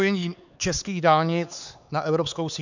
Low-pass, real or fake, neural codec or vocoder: 7.2 kHz; fake; codec, 16 kHz, 4 kbps, X-Codec, HuBERT features, trained on LibriSpeech